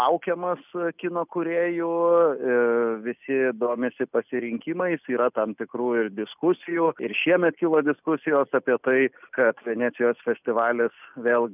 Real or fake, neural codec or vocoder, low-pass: real; none; 3.6 kHz